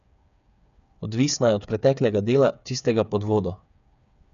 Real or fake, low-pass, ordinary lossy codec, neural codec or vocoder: fake; 7.2 kHz; none; codec, 16 kHz, 8 kbps, FreqCodec, smaller model